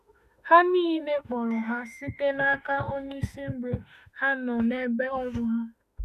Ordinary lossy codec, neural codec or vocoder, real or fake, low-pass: none; autoencoder, 48 kHz, 32 numbers a frame, DAC-VAE, trained on Japanese speech; fake; 14.4 kHz